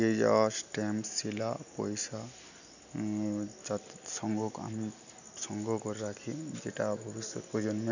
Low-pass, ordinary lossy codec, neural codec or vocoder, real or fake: 7.2 kHz; none; none; real